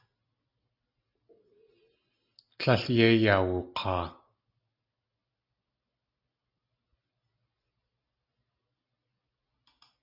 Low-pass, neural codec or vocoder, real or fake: 5.4 kHz; none; real